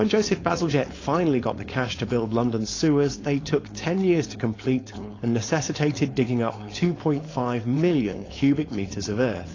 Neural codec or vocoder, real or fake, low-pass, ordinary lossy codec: codec, 16 kHz, 4.8 kbps, FACodec; fake; 7.2 kHz; AAC, 32 kbps